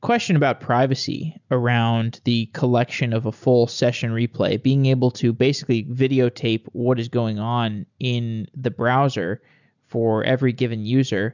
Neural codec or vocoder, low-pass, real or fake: none; 7.2 kHz; real